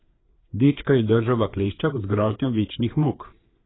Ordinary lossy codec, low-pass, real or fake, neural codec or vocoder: AAC, 16 kbps; 7.2 kHz; fake; codec, 16 kHz, 4 kbps, FreqCodec, larger model